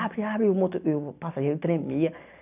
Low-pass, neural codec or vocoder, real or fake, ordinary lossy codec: 3.6 kHz; none; real; none